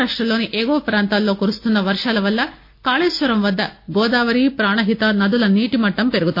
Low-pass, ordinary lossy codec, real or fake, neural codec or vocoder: 5.4 kHz; MP3, 32 kbps; real; none